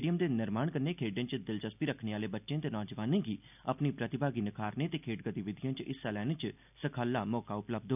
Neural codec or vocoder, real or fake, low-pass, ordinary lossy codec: none; real; 3.6 kHz; none